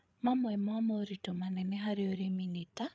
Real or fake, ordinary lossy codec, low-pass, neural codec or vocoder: fake; none; none; codec, 16 kHz, 8 kbps, FreqCodec, larger model